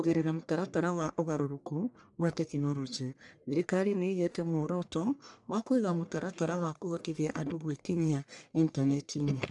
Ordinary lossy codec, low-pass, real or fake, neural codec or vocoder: none; 10.8 kHz; fake; codec, 44.1 kHz, 1.7 kbps, Pupu-Codec